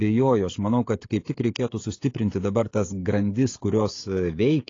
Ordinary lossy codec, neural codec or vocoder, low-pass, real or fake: AAC, 32 kbps; codec, 16 kHz, 16 kbps, FreqCodec, smaller model; 7.2 kHz; fake